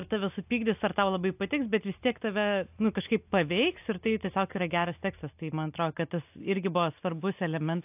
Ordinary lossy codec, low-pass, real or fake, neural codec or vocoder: AAC, 32 kbps; 3.6 kHz; real; none